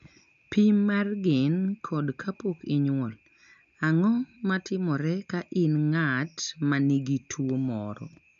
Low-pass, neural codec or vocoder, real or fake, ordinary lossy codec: 7.2 kHz; none; real; none